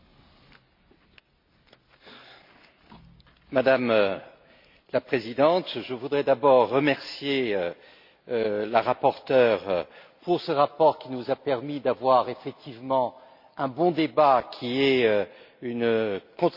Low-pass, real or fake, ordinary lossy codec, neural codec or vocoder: 5.4 kHz; real; MP3, 32 kbps; none